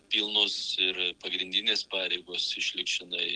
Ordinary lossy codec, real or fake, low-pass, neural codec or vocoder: Opus, 16 kbps; real; 9.9 kHz; none